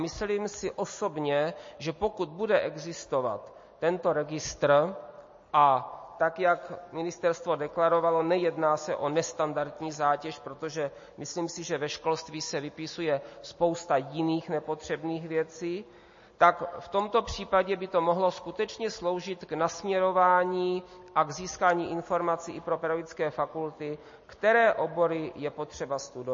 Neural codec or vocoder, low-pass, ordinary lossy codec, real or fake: none; 7.2 kHz; MP3, 32 kbps; real